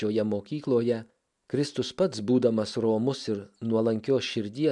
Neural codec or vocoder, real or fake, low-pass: none; real; 10.8 kHz